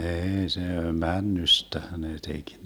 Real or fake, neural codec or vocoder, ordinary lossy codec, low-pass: real; none; none; none